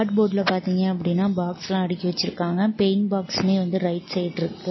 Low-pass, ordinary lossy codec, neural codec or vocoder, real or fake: 7.2 kHz; MP3, 24 kbps; none; real